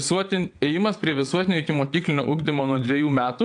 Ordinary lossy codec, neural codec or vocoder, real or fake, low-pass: AAC, 64 kbps; vocoder, 22.05 kHz, 80 mel bands, WaveNeXt; fake; 9.9 kHz